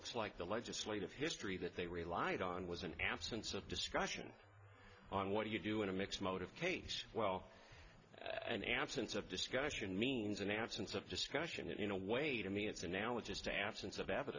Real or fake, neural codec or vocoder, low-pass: real; none; 7.2 kHz